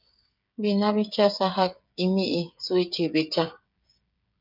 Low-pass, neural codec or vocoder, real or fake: 5.4 kHz; codec, 16 kHz, 8 kbps, FreqCodec, smaller model; fake